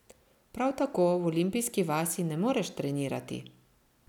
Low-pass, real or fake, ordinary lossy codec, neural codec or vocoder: 19.8 kHz; real; none; none